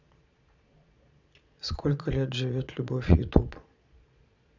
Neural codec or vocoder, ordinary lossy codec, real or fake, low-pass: vocoder, 22.05 kHz, 80 mel bands, Vocos; none; fake; 7.2 kHz